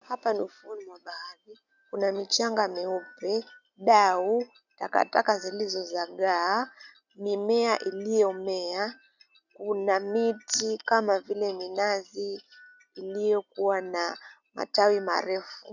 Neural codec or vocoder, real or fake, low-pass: none; real; 7.2 kHz